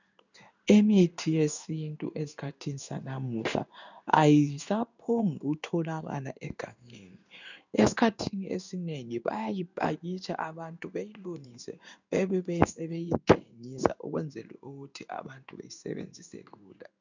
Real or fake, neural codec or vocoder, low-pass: fake; codec, 16 kHz in and 24 kHz out, 1 kbps, XY-Tokenizer; 7.2 kHz